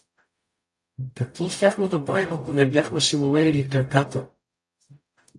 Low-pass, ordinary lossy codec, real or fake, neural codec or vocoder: 10.8 kHz; AAC, 64 kbps; fake; codec, 44.1 kHz, 0.9 kbps, DAC